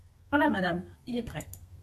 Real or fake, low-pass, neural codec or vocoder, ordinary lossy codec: fake; 14.4 kHz; codec, 32 kHz, 1.9 kbps, SNAC; MP3, 96 kbps